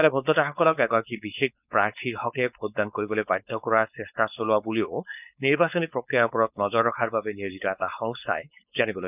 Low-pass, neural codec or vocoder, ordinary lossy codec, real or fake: 3.6 kHz; codec, 16 kHz, 4.8 kbps, FACodec; none; fake